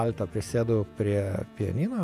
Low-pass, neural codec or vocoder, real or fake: 14.4 kHz; vocoder, 48 kHz, 128 mel bands, Vocos; fake